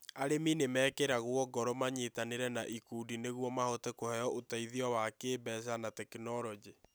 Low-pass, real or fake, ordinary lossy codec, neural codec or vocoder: none; real; none; none